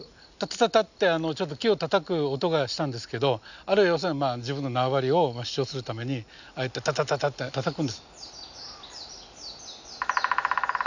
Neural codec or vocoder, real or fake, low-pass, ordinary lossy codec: none; real; 7.2 kHz; none